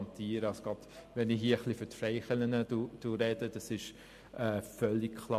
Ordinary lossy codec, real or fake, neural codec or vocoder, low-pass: MP3, 64 kbps; real; none; 14.4 kHz